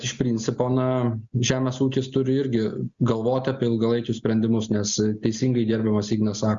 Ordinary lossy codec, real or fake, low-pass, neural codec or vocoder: Opus, 64 kbps; real; 7.2 kHz; none